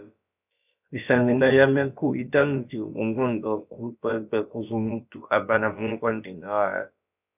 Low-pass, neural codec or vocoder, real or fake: 3.6 kHz; codec, 16 kHz, about 1 kbps, DyCAST, with the encoder's durations; fake